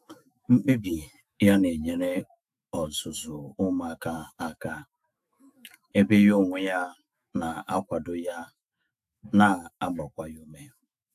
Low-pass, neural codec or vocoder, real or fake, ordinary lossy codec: 14.4 kHz; autoencoder, 48 kHz, 128 numbers a frame, DAC-VAE, trained on Japanese speech; fake; AAC, 96 kbps